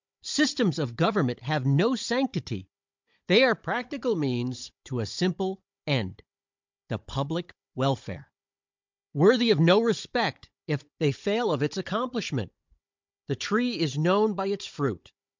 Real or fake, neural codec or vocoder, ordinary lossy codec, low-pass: fake; codec, 16 kHz, 16 kbps, FunCodec, trained on Chinese and English, 50 frames a second; MP3, 64 kbps; 7.2 kHz